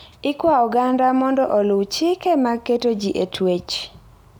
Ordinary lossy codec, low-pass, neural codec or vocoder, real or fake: none; none; none; real